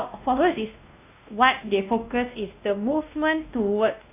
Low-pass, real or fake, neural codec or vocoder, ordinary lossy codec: 3.6 kHz; fake; codec, 16 kHz, 1 kbps, X-Codec, WavLM features, trained on Multilingual LibriSpeech; none